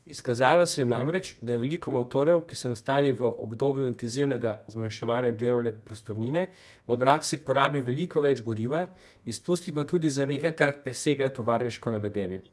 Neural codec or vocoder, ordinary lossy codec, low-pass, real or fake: codec, 24 kHz, 0.9 kbps, WavTokenizer, medium music audio release; none; none; fake